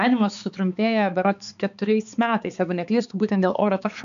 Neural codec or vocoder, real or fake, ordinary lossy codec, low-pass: codec, 16 kHz, 4 kbps, X-Codec, HuBERT features, trained on balanced general audio; fake; MP3, 96 kbps; 7.2 kHz